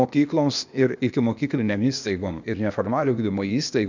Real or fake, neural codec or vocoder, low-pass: fake; codec, 16 kHz, 0.8 kbps, ZipCodec; 7.2 kHz